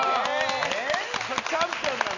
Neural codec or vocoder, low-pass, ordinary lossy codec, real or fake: none; 7.2 kHz; none; real